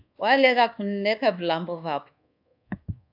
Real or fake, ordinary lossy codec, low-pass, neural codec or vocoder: fake; AAC, 48 kbps; 5.4 kHz; codec, 24 kHz, 1.2 kbps, DualCodec